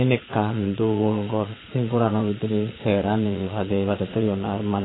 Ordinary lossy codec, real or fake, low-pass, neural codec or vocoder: AAC, 16 kbps; fake; 7.2 kHz; vocoder, 22.05 kHz, 80 mel bands, Vocos